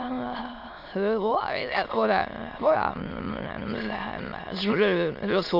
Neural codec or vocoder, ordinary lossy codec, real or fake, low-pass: autoencoder, 22.05 kHz, a latent of 192 numbers a frame, VITS, trained on many speakers; AAC, 48 kbps; fake; 5.4 kHz